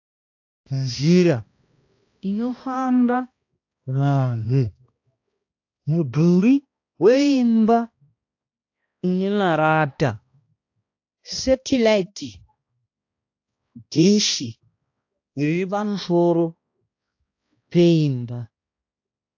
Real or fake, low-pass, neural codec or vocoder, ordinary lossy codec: fake; 7.2 kHz; codec, 16 kHz, 1 kbps, X-Codec, HuBERT features, trained on balanced general audio; AAC, 48 kbps